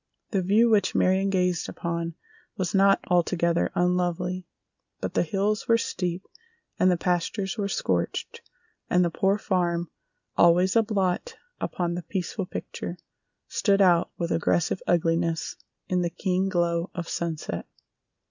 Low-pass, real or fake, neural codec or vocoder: 7.2 kHz; real; none